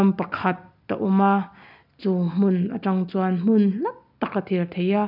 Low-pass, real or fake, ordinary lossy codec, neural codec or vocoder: 5.4 kHz; real; none; none